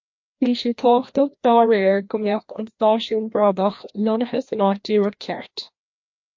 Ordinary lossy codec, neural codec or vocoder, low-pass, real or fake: MP3, 48 kbps; codec, 16 kHz, 1 kbps, FreqCodec, larger model; 7.2 kHz; fake